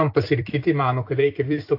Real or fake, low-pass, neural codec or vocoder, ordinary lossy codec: fake; 5.4 kHz; vocoder, 44.1 kHz, 128 mel bands every 256 samples, BigVGAN v2; AAC, 32 kbps